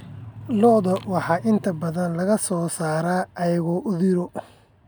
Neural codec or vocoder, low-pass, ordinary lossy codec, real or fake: none; none; none; real